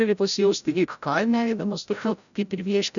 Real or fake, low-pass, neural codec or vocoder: fake; 7.2 kHz; codec, 16 kHz, 0.5 kbps, FreqCodec, larger model